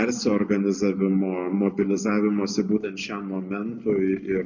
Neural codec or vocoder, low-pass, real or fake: none; 7.2 kHz; real